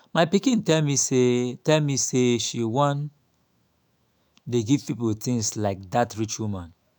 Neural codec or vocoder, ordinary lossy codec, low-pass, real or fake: autoencoder, 48 kHz, 128 numbers a frame, DAC-VAE, trained on Japanese speech; none; none; fake